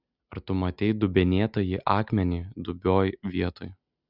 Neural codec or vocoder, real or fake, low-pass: none; real; 5.4 kHz